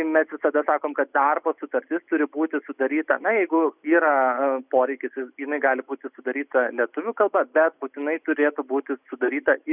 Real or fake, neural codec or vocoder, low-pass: real; none; 3.6 kHz